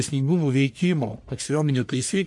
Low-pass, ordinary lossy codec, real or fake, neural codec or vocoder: 10.8 kHz; MP3, 64 kbps; fake; codec, 44.1 kHz, 1.7 kbps, Pupu-Codec